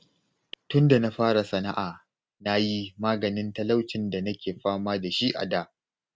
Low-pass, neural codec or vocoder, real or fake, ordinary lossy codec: none; none; real; none